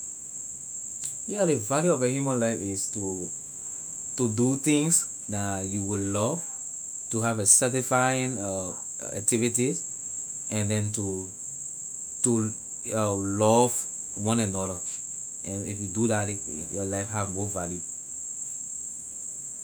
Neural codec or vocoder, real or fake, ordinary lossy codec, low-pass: autoencoder, 48 kHz, 128 numbers a frame, DAC-VAE, trained on Japanese speech; fake; none; none